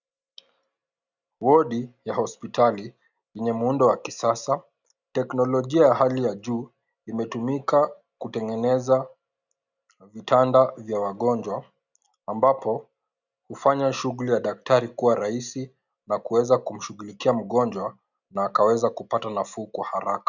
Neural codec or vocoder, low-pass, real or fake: none; 7.2 kHz; real